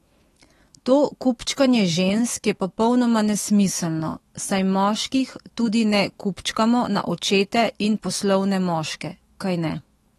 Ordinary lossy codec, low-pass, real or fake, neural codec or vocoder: AAC, 32 kbps; 19.8 kHz; fake; autoencoder, 48 kHz, 128 numbers a frame, DAC-VAE, trained on Japanese speech